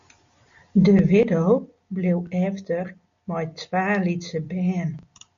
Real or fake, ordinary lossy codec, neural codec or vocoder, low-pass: real; AAC, 96 kbps; none; 7.2 kHz